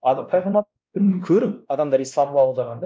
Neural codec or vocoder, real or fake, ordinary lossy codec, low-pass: codec, 16 kHz, 1 kbps, X-Codec, WavLM features, trained on Multilingual LibriSpeech; fake; none; none